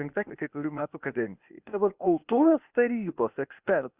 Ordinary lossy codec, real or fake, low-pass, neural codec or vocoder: Opus, 64 kbps; fake; 3.6 kHz; codec, 16 kHz, 0.8 kbps, ZipCodec